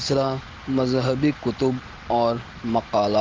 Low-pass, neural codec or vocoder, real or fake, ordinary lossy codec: 7.2 kHz; none; real; Opus, 16 kbps